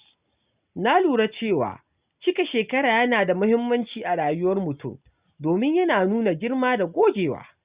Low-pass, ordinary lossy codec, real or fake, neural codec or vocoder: 3.6 kHz; Opus, 64 kbps; real; none